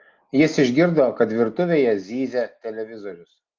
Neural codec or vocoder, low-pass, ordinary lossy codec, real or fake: none; 7.2 kHz; Opus, 24 kbps; real